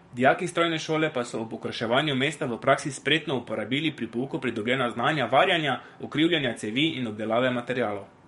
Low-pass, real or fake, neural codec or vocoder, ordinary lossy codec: 19.8 kHz; fake; codec, 44.1 kHz, 7.8 kbps, DAC; MP3, 48 kbps